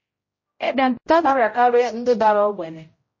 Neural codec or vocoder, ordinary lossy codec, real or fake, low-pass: codec, 16 kHz, 0.5 kbps, X-Codec, HuBERT features, trained on general audio; MP3, 32 kbps; fake; 7.2 kHz